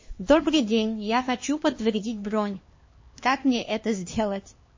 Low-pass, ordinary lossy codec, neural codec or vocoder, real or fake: 7.2 kHz; MP3, 32 kbps; codec, 16 kHz, 2 kbps, X-Codec, HuBERT features, trained on LibriSpeech; fake